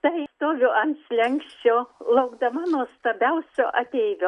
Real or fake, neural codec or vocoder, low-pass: real; none; 10.8 kHz